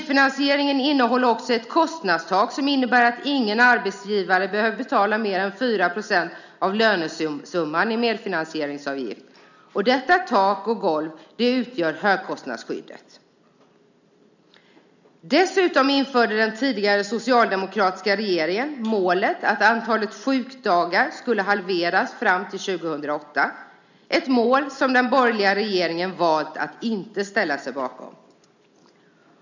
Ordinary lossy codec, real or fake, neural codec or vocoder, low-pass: none; real; none; 7.2 kHz